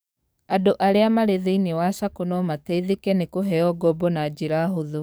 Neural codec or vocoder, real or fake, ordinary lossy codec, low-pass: codec, 44.1 kHz, 7.8 kbps, DAC; fake; none; none